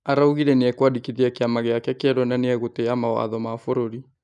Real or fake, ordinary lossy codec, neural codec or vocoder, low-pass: real; none; none; 10.8 kHz